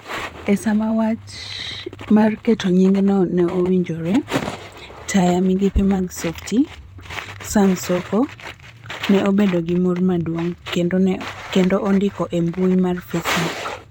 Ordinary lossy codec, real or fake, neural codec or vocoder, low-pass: none; fake; vocoder, 44.1 kHz, 128 mel bands, Pupu-Vocoder; 19.8 kHz